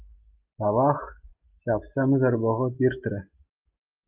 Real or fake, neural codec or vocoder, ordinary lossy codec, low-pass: real; none; Opus, 24 kbps; 3.6 kHz